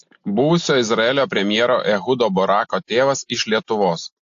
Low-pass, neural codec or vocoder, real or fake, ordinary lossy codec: 7.2 kHz; none; real; AAC, 48 kbps